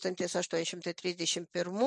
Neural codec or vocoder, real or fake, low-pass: none; real; 10.8 kHz